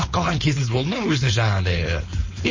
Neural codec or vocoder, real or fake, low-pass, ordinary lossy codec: codec, 16 kHz, 4.8 kbps, FACodec; fake; 7.2 kHz; MP3, 32 kbps